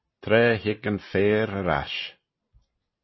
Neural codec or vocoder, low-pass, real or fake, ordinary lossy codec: none; 7.2 kHz; real; MP3, 24 kbps